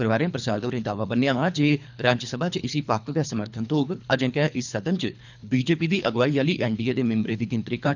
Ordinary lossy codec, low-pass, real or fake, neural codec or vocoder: none; 7.2 kHz; fake; codec, 24 kHz, 3 kbps, HILCodec